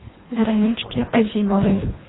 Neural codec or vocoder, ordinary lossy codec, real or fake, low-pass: codec, 24 kHz, 1.5 kbps, HILCodec; AAC, 16 kbps; fake; 7.2 kHz